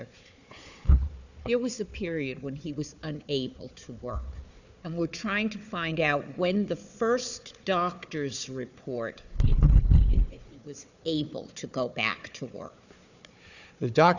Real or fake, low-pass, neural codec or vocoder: fake; 7.2 kHz; codec, 16 kHz, 4 kbps, FunCodec, trained on Chinese and English, 50 frames a second